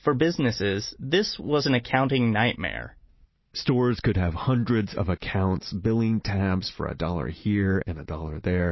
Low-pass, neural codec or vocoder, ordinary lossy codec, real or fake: 7.2 kHz; none; MP3, 24 kbps; real